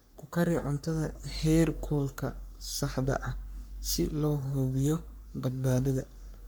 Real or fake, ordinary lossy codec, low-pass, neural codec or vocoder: fake; none; none; codec, 44.1 kHz, 3.4 kbps, Pupu-Codec